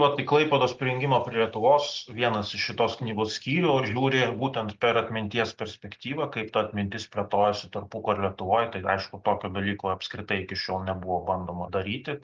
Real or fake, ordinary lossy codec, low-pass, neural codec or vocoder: real; Opus, 32 kbps; 7.2 kHz; none